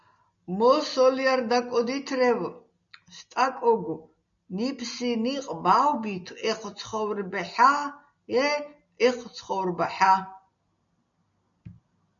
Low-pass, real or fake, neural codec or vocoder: 7.2 kHz; real; none